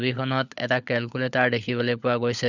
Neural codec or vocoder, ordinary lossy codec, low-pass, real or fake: codec, 16 kHz, 16 kbps, FunCodec, trained on LibriTTS, 50 frames a second; none; 7.2 kHz; fake